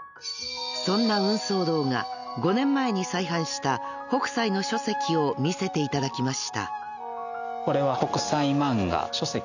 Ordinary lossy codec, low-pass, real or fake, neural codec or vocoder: none; 7.2 kHz; real; none